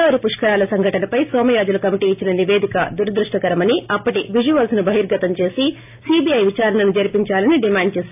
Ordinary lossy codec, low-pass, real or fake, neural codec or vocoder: AAC, 32 kbps; 3.6 kHz; real; none